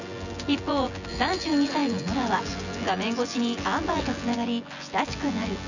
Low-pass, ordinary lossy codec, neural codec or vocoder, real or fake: 7.2 kHz; none; vocoder, 24 kHz, 100 mel bands, Vocos; fake